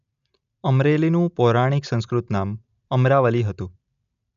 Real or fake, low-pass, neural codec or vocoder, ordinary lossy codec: real; 7.2 kHz; none; none